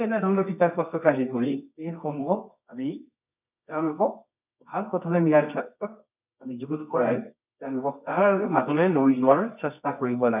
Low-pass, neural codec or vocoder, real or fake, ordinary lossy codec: 3.6 kHz; codec, 24 kHz, 0.9 kbps, WavTokenizer, medium music audio release; fake; none